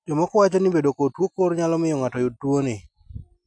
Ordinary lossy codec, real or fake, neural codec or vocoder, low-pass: none; real; none; 9.9 kHz